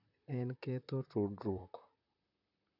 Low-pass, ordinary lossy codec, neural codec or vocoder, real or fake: 5.4 kHz; none; vocoder, 44.1 kHz, 128 mel bands, Pupu-Vocoder; fake